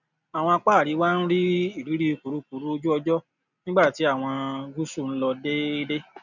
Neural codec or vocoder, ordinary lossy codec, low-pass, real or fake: none; none; 7.2 kHz; real